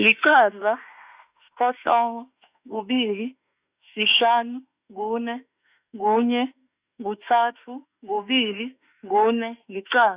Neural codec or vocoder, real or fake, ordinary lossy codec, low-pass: autoencoder, 48 kHz, 32 numbers a frame, DAC-VAE, trained on Japanese speech; fake; Opus, 64 kbps; 3.6 kHz